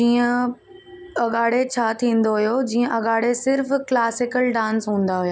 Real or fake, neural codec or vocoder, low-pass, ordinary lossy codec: real; none; none; none